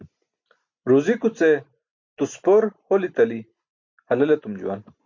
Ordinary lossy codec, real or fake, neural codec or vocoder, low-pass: MP3, 48 kbps; real; none; 7.2 kHz